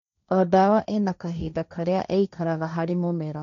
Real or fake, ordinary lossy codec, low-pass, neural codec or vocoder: fake; none; 7.2 kHz; codec, 16 kHz, 1.1 kbps, Voila-Tokenizer